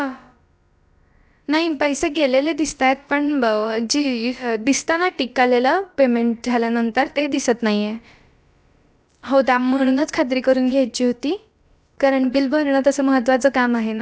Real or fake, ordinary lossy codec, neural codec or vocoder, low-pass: fake; none; codec, 16 kHz, about 1 kbps, DyCAST, with the encoder's durations; none